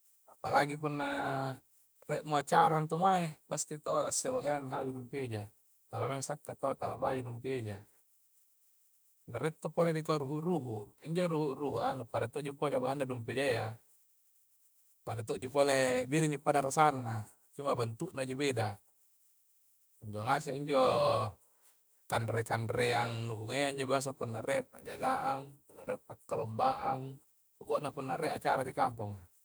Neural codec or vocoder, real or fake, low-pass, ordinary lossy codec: autoencoder, 48 kHz, 32 numbers a frame, DAC-VAE, trained on Japanese speech; fake; none; none